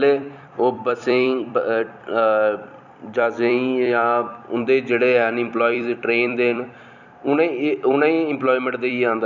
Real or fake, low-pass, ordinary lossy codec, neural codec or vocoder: fake; 7.2 kHz; none; vocoder, 44.1 kHz, 128 mel bands every 256 samples, BigVGAN v2